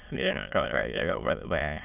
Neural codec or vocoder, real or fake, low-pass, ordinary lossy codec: autoencoder, 22.05 kHz, a latent of 192 numbers a frame, VITS, trained on many speakers; fake; 3.6 kHz; none